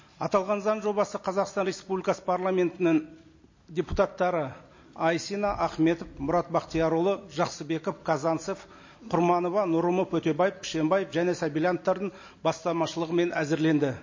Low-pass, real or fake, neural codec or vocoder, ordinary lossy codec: 7.2 kHz; real; none; MP3, 32 kbps